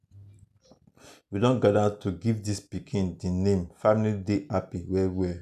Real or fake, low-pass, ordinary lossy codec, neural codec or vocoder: real; 14.4 kHz; none; none